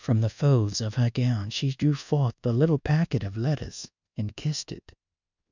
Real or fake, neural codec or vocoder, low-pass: fake; codec, 24 kHz, 1.2 kbps, DualCodec; 7.2 kHz